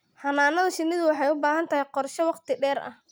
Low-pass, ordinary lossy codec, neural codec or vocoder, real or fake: none; none; none; real